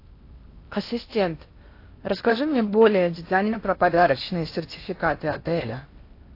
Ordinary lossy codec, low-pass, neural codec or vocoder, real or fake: AAC, 32 kbps; 5.4 kHz; codec, 16 kHz in and 24 kHz out, 0.8 kbps, FocalCodec, streaming, 65536 codes; fake